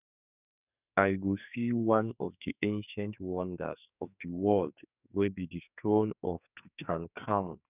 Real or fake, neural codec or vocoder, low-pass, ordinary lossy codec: fake; codec, 44.1 kHz, 2.6 kbps, SNAC; 3.6 kHz; none